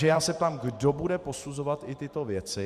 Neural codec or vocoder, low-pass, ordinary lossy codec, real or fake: vocoder, 44.1 kHz, 128 mel bands every 512 samples, BigVGAN v2; 14.4 kHz; Opus, 64 kbps; fake